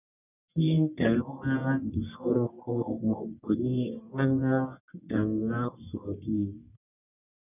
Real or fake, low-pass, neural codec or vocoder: fake; 3.6 kHz; codec, 44.1 kHz, 1.7 kbps, Pupu-Codec